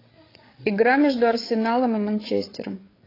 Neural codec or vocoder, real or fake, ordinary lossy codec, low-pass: codec, 16 kHz, 8 kbps, FreqCodec, larger model; fake; AAC, 24 kbps; 5.4 kHz